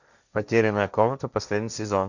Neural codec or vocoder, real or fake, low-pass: codec, 16 kHz, 1.1 kbps, Voila-Tokenizer; fake; 7.2 kHz